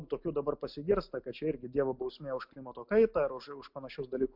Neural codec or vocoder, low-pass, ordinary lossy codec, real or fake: none; 5.4 kHz; MP3, 48 kbps; real